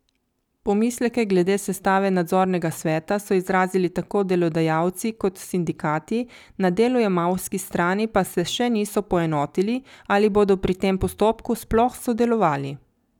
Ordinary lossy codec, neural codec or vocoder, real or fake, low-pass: none; none; real; 19.8 kHz